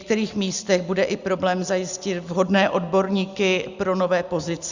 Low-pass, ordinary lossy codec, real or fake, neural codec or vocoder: 7.2 kHz; Opus, 64 kbps; real; none